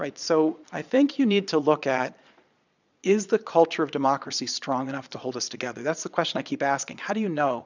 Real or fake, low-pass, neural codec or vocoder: fake; 7.2 kHz; vocoder, 22.05 kHz, 80 mel bands, Vocos